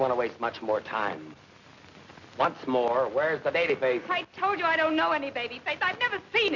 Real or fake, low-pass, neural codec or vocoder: real; 7.2 kHz; none